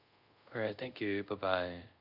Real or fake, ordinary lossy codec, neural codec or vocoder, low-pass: fake; none; codec, 24 kHz, 0.5 kbps, DualCodec; 5.4 kHz